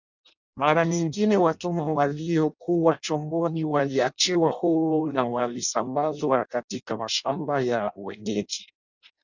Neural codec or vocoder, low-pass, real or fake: codec, 16 kHz in and 24 kHz out, 0.6 kbps, FireRedTTS-2 codec; 7.2 kHz; fake